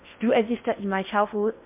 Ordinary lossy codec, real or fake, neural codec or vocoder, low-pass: MP3, 32 kbps; fake; codec, 16 kHz in and 24 kHz out, 0.6 kbps, FocalCodec, streaming, 4096 codes; 3.6 kHz